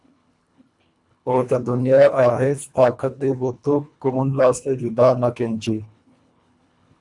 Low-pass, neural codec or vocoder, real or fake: 10.8 kHz; codec, 24 kHz, 1.5 kbps, HILCodec; fake